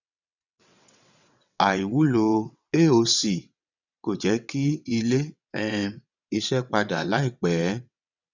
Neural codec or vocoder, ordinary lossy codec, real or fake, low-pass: vocoder, 22.05 kHz, 80 mel bands, WaveNeXt; none; fake; 7.2 kHz